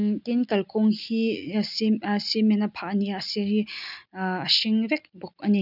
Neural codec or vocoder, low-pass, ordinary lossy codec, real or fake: none; 5.4 kHz; none; real